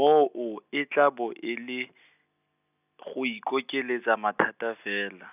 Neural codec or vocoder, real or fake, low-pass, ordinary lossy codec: none; real; 3.6 kHz; none